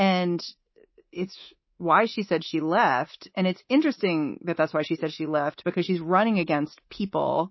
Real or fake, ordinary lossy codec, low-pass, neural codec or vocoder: fake; MP3, 24 kbps; 7.2 kHz; codec, 24 kHz, 3.1 kbps, DualCodec